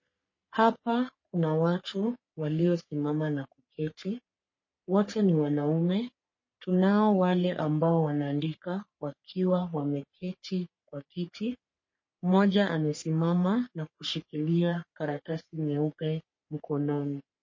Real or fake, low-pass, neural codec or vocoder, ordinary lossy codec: fake; 7.2 kHz; codec, 44.1 kHz, 3.4 kbps, Pupu-Codec; MP3, 32 kbps